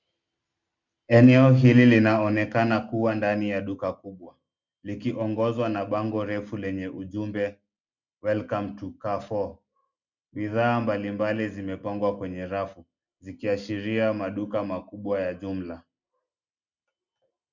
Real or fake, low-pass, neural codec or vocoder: real; 7.2 kHz; none